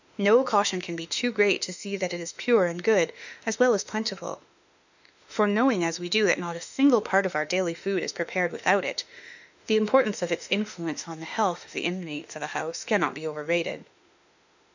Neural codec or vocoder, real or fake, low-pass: autoencoder, 48 kHz, 32 numbers a frame, DAC-VAE, trained on Japanese speech; fake; 7.2 kHz